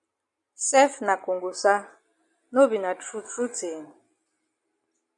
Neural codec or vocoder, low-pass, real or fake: none; 10.8 kHz; real